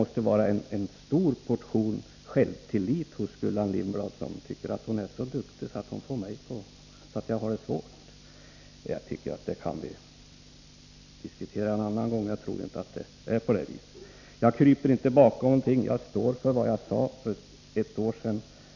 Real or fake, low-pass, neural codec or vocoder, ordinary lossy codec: real; 7.2 kHz; none; none